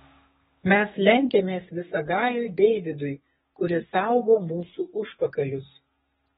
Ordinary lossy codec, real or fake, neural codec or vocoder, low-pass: AAC, 16 kbps; fake; codec, 32 kHz, 1.9 kbps, SNAC; 14.4 kHz